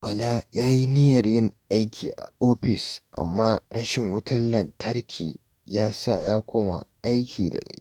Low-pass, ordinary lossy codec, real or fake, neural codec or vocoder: 19.8 kHz; none; fake; codec, 44.1 kHz, 2.6 kbps, DAC